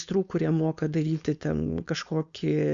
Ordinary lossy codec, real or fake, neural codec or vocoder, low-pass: Opus, 64 kbps; fake; codec, 16 kHz, 4.8 kbps, FACodec; 7.2 kHz